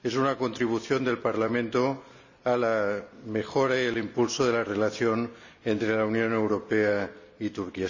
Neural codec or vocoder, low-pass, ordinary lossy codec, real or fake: none; 7.2 kHz; none; real